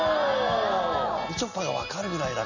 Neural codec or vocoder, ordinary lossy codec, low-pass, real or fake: none; none; 7.2 kHz; real